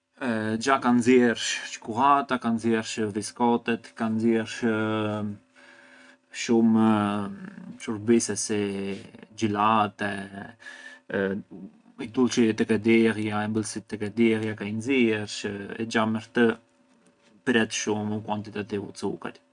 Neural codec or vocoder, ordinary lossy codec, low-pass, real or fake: none; none; 9.9 kHz; real